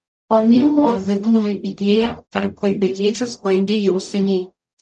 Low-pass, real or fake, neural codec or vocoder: 10.8 kHz; fake; codec, 44.1 kHz, 0.9 kbps, DAC